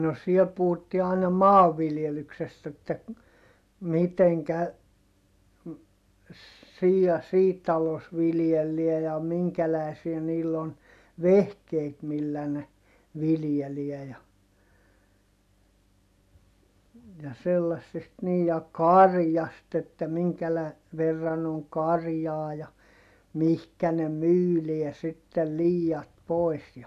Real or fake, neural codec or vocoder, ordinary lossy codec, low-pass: real; none; none; 14.4 kHz